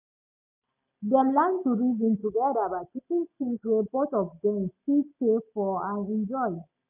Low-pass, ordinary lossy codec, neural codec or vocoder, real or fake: 3.6 kHz; none; none; real